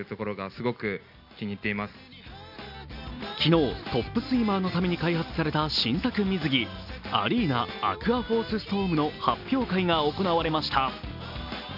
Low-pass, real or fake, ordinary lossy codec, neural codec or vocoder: 5.4 kHz; real; none; none